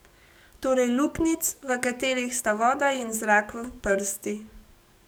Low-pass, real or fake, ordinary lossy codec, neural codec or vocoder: none; fake; none; codec, 44.1 kHz, 7.8 kbps, DAC